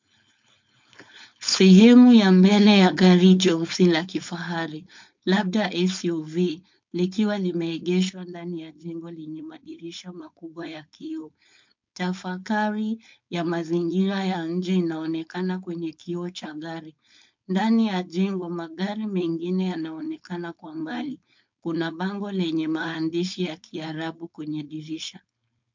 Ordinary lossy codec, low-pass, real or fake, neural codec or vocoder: MP3, 48 kbps; 7.2 kHz; fake; codec, 16 kHz, 4.8 kbps, FACodec